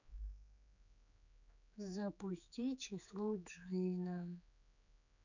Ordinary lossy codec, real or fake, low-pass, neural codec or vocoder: none; fake; 7.2 kHz; codec, 16 kHz, 4 kbps, X-Codec, HuBERT features, trained on general audio